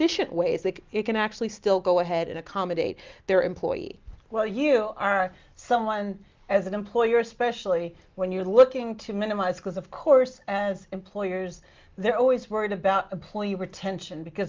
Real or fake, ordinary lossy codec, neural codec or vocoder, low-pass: real; Opus, 32 kbps; none; 7.2 kHz